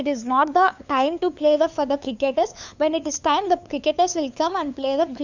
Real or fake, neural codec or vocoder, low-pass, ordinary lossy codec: fake; codec, 16 kHz, 2 kbps, FunCodec, trained on LibriTTS, 25 frames a second; 7.2 kHz; none